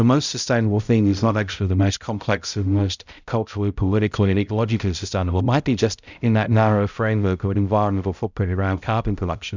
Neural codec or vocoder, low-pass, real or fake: codec, 16 kHz, 0.5 kbps, X-Codec, HuBERT features, trained on balanced general audio; 7.2 kHz; fake